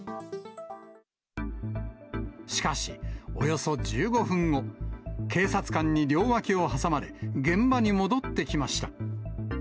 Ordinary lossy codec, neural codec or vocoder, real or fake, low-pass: none; none; real; none